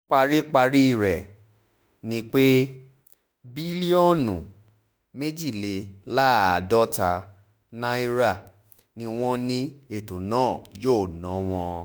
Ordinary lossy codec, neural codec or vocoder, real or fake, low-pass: none; autoencoder, 48 kHz, 32 numbers a frame, DAC-VAE, trained on Japanese speech; fake; none